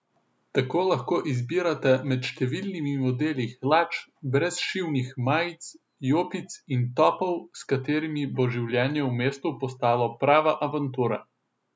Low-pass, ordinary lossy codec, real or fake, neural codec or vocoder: none; none; real; none